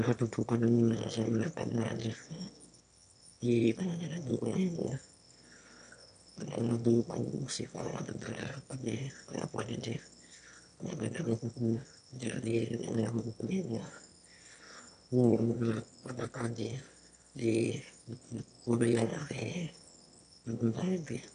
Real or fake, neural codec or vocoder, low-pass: fake; autoencoder, 22.05 kHz, a latent of 192 numbers a frame, VITS, trained on one speaker; 9.9 kHz